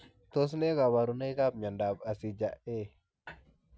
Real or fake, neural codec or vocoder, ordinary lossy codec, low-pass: real; none; none; none